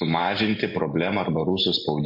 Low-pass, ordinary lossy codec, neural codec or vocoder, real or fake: 5.4 kHz; MP3, 32 kbps; none; real